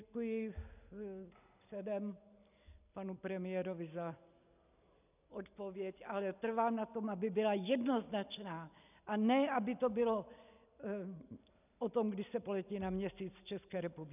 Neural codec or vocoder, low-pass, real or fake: none; 3.6 kHz; real